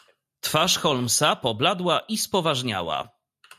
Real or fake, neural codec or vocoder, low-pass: real; none; 14.4 kHz